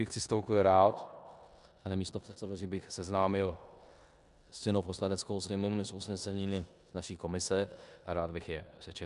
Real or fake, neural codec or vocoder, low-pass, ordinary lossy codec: fake; codec, 16 kHz in and 24 kHz out, 0.9 kbps, LongCat-Audio-Codec, four codebook decoder; 10.8 kHz; MP3, 96 kbps